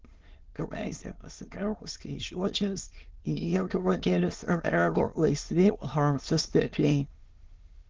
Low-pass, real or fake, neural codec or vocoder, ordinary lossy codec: 7.2 kHz; fake; autoencoder, 22.05 kHz, a latent of 192 numbers a frame, VITS, trained on many speakers; Opus, 16 kbps